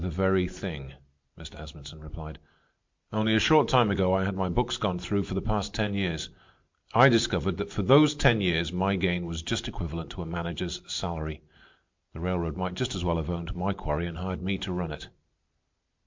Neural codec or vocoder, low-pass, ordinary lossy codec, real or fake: none; 7.2 kHz; MP3, 64 kbps; real